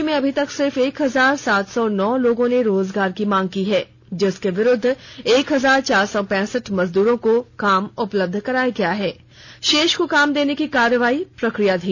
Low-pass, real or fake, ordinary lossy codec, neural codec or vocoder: none; real; none; none